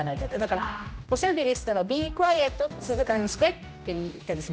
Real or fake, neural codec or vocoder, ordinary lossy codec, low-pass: fake; codec, 16 kHz, 1 kbps, X-Codec, HuBERT features, trained on general audio; none; none